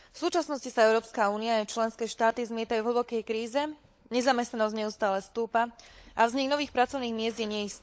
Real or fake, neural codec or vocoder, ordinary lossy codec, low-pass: fake; codec, 16 kHz, 16 kbps, FunCodec, trained on LibriTTS, 50 frames a second; none; none